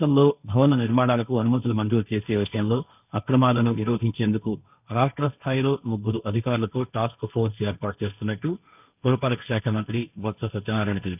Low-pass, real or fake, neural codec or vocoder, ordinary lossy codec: 3.6 kHz; fake; codec, 16 kHz, 1.1 kbps, Voila-Tokenizer; none